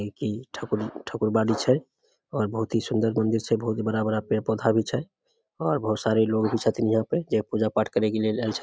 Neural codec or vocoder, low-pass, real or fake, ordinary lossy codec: none; none; real; none